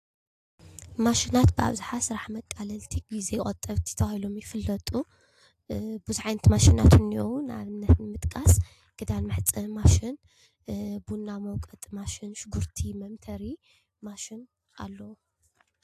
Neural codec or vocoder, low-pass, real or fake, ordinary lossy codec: none; 14.4 kHz; real; AAC, 64 kbps